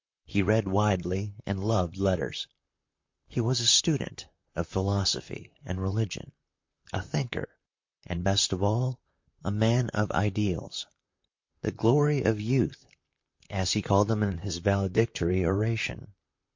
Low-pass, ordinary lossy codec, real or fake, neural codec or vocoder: 7.2 kHz; MP3, 48 kbps; fake; vocoder, 44.1 kHz, 128 mel bands, Pupu-Vocoder